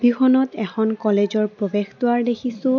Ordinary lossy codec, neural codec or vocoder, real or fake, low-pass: none; none; real; 7.2 kHz